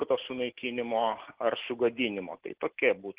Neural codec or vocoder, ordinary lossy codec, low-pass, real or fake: none; Opus, 16 kbps; 3.6 kHz; real